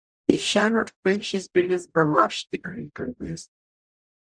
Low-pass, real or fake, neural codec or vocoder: 9.9 kHz; fake; codec, 44.1 kHz, 0.9 kbps, DAC